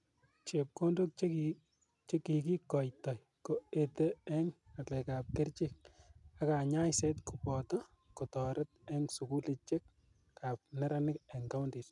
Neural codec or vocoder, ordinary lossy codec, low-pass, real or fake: none; none; 10.8 kHz; real